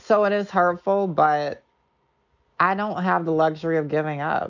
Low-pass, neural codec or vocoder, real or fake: 7.2 kHz; none; real